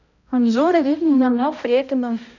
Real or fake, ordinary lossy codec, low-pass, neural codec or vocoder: fake; none; 7.2 kHz; codec, 16 kHz, 0.5 kbps, X-Codec, HuBERT features, trained on balanced general audio